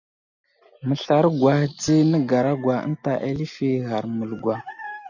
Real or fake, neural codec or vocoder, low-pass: real; none; 7.2 kHz